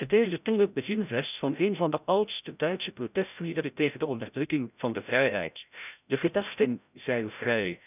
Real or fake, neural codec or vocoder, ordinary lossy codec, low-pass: fake; codec, 16 kHz, 0.5 kbps, FreqCodec, larger model; none; 3.6 kHz